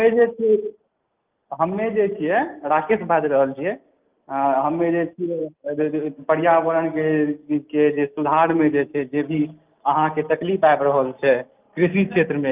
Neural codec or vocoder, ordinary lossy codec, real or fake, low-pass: none; Opus, 24 kbps; real; 3.6 kHz